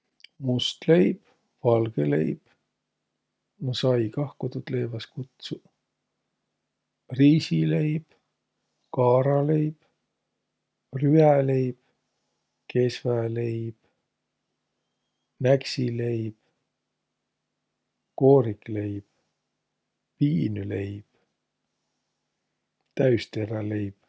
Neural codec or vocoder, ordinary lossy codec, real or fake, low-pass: none; none; real; none